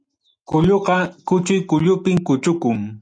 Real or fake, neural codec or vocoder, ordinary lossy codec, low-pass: real; none; MP3, 96 kbps; 9.9 kHz